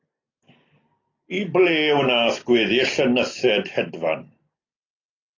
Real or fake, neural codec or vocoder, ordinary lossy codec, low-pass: real; none; AAC, 32 kbps; 7.2 kHz